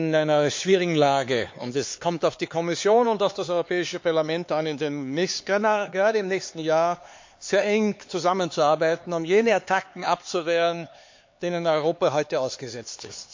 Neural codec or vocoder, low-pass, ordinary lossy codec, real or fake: codec, 16 kHz, 4 kbps, X-Codec, HuBERT features, trained on LibriSpeech; 7.2 kHz; MP3, 48 kbps; fake